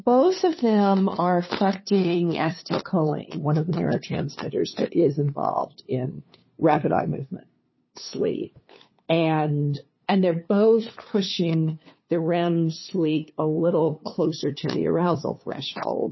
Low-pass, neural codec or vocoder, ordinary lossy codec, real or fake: 7.2 kHz; codec, 16 kHz, 2 kbps, FunCodec, trained on LibriTTS, 25 frames a second; MP3, 24 kbps; fake